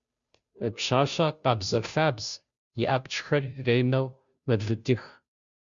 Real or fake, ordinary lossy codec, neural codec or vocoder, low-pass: fake; Opus, 64 kbps; codec, 16 kHz, 0.5 kbps, FunCodec, trained on Chinese and English, 25 frames a second; 7.2 kHz